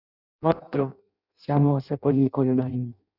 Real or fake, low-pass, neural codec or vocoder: fake; 5.4 kHz; codec, 16 kHz in and 24 kHz out, 0.6 kbps, FireRedTTS-2 codec